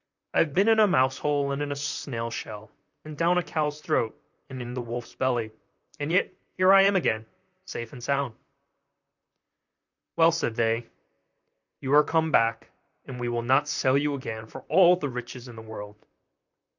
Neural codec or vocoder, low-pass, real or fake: vocoder, 44.1 kHz, 128 mel bands, Pupu-Vocoder; 7.2 kHz; fake